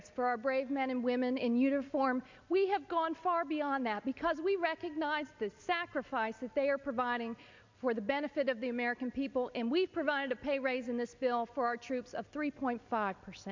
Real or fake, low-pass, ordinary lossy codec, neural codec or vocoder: real; 7.2 kHz; MP3, 64 kbps; none